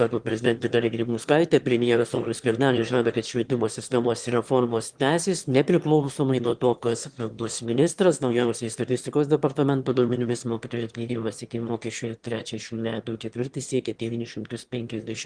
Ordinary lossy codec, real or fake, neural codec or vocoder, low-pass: Opus, 24 kbps; fake; autoencoder, 22.05 kHz, a latent of 192 numbers a frame, VITS, trained on one speaker; 9.9 kHz